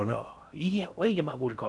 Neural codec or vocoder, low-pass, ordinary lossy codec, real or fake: codec, 16 kHz in and 24 kHz out, 0.6 kbps, FocalCodec, streaming, 4096 codes; 10.8 kHz; none; fake